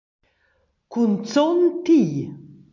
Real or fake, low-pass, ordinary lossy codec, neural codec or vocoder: real; 7.2 kHz; MP3, 48 kbps; none